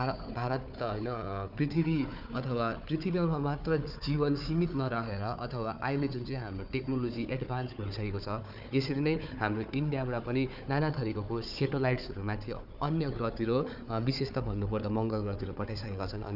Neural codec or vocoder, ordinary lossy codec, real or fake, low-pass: codec, 16 kHz, 4 kbps, FunCodec, trained on Chinese and English, 50 frames a second; none; fake; 5.4 kHz